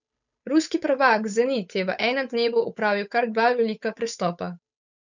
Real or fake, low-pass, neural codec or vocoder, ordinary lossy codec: fake; 7.2 kHz; codec, 16 kHz, 8 kbps, FunCodec, trained on Chinese and English, 25 frames a second; none